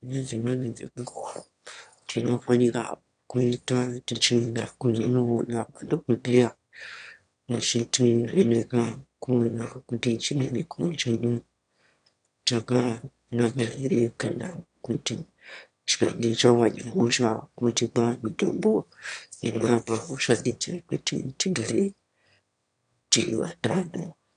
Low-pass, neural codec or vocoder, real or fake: 9.9 kHz; autoencoder, 22.05 kHz, a latent of 192 numbers a frame, VITS, trained on one speaker; fake